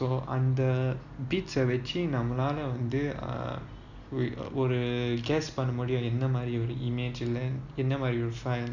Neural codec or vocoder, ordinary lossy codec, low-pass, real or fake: none; none; 7.2 kHz; real